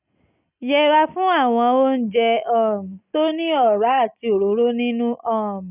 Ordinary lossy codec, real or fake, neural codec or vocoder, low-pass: none; real; none; 3.6 kHz